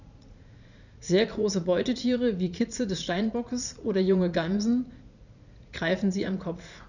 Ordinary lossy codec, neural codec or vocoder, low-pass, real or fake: none; vocoder, 44.1 kHz, 80 mel bands, Vocos; 7.2 kHz; fake